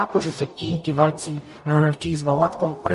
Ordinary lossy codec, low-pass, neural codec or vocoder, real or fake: MP3, 48 kbps; 14.4 kHz; codec, 44.1 kHz, 0.9 kbps, DAC; fake